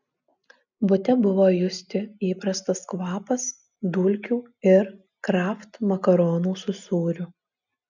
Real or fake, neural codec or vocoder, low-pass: real; none; 7.2 kHz